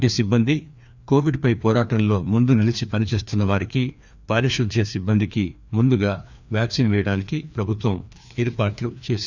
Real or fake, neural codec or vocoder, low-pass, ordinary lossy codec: fake; codec, 16 kHz, 2 kbps, FreqCodec, larger model; 7.2 kHz; none